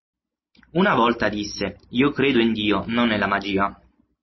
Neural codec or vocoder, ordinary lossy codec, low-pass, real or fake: none; MP3, 24 kbps; 7.2 kHz; real